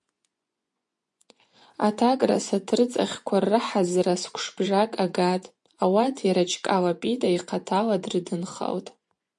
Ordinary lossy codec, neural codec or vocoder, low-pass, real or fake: MP3, 64 kbps; vocoder, 24 kHz, 100 mel bands, Vocos; 10.8 kHz; fake